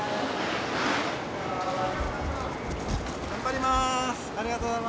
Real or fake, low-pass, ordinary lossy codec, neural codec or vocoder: real; none; none; none